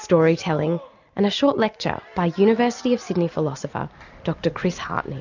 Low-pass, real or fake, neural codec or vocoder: 7.2 kHz; real; none